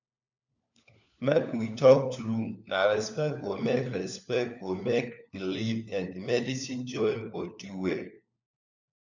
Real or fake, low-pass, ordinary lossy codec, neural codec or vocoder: fake; 7.2 kHz; none; codec, 16 kHz, 4 kbps, FunCodec, trained on LibriTTS, 50 frames a second